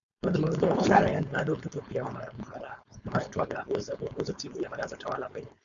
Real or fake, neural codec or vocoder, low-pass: fake; codec, 16 kHz, 4.8 kbps, FACodec; 7.2 kHz